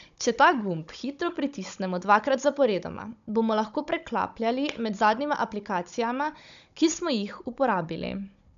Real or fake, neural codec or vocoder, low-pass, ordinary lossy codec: fake; codec, 16 kHz, 16 kbps, FunCodec, trained on Chinese and English, 50 frames a second; 7.2 kHz; MP3, 96 kbps